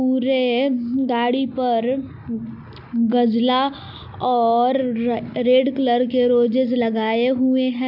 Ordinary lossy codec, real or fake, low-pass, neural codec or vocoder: MP3, 48 kbps; real; 5.4 kHz; none